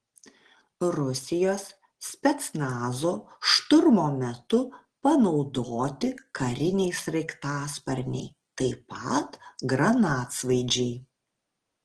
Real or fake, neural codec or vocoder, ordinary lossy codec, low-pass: real; none; Opus, 16 kbps; 9.9 kHz